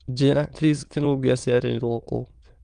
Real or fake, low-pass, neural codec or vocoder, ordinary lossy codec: fake; 9.9 kHz; autoencoder, 22.05 kHz, a latent of 192 numbers a frame, VITS, trained on many speakers; Opus, 32 kbps